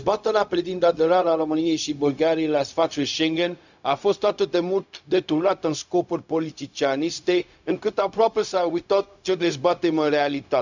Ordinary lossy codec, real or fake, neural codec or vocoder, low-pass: none; fake; codec, 16 kHz, 0.4 kbps, LongCat-Audio-Codec; 7.2 kHz